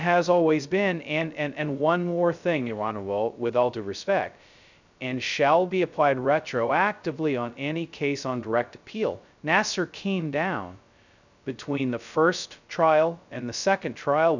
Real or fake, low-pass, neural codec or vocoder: fake; 7.2 kHz; codec, 16 kHz, 0.2 kbps, FocalCodec